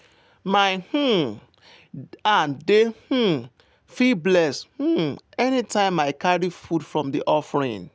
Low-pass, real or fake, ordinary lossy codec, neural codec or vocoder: none; real; none; none